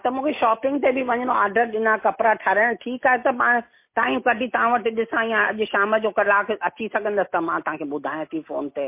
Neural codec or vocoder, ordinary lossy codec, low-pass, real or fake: none; MP3, 24 kbps; 3.6 kHz; real